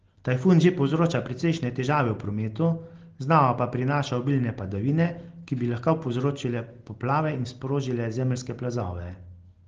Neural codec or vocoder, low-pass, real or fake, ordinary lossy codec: none; 7.2 kHz; real; Opus, 16 kbps